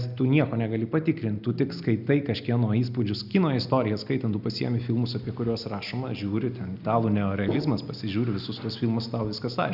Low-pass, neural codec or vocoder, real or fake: 5.4 kHz; none; real